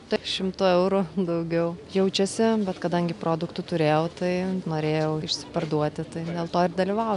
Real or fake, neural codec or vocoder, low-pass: real; none; 10.8 kHz